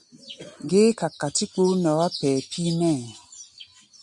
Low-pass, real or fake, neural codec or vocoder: 10.8 kHz; real; none